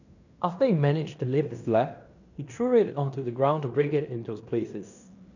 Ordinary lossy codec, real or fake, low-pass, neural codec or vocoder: none; fake; 7.2 kHz; codec, 16 kHz in and 24 kHz out, 0.9 kbps, LongCat-Audio-Codec, fine tuned four codebook decoder